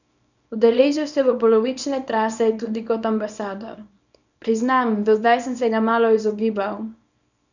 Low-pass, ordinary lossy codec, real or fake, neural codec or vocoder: 7.2 kHz; none; fake; codec, 24 kHz, 0.9 kbps, WavTokenizer, small release